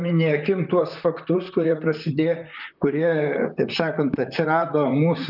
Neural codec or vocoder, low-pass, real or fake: vocoder, 44.1 kHz, 128 mel bands, Pupu-Vocoder; 5.4 kHz; fake